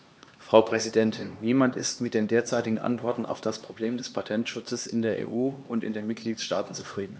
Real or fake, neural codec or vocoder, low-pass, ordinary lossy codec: fake; codec, 16 kHz, 2 kbps, X-Codec, HuBERT features, trained on LibriSpeech; none; none